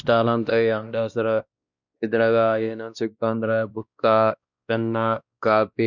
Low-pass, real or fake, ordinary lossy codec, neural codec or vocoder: 7.2 kHz; fake; none; codec, 16 kHz, 1 kbps, X-Codec, WavLM features, trained on Multilingual LibriSpeech